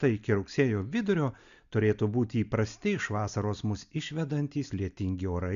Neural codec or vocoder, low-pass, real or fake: none; 7.2 kHz; real